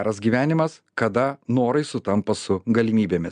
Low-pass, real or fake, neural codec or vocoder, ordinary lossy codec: 9.9 kHz; real; none; MP3, 96 kbps